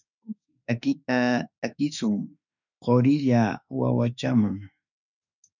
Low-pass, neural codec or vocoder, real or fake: 7.2 kHz; autoencoder, 48 kHz, 32 numbers a frame, DAC-VAE, trained on Japanese speech; fake